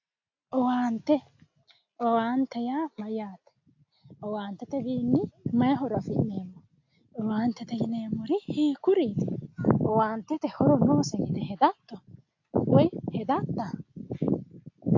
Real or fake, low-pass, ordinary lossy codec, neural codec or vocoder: real; 7.2 kHz; AAC, 48 kbps; none